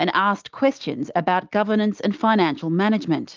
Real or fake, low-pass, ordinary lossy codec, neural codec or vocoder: real; 7.2 kHz; Opus, 32 kbps; none